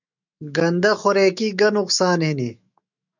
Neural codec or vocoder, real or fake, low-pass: autoencoder, 48 kHz, 128 numbers a frame, DAC-VAE, trained on Japanese speech; fake; 7.2 kHz